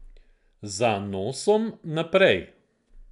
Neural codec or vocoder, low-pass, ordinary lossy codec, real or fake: none; 10.8 kHz; none; real